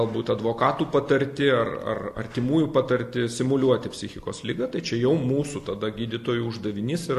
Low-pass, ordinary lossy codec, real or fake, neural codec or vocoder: 14.4 kHz; AAC, 64 kbps; real; none